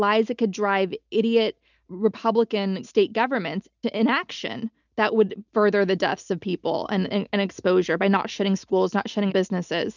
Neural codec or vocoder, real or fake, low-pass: none; real; 7.2 kHz